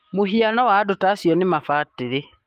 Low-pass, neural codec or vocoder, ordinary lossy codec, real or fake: 14.4 kHz; autoencoder, 48 kHz, 128 numbers a frame, DAC-VAE, trained on Japanese speech; Opus, 24 kbps; fake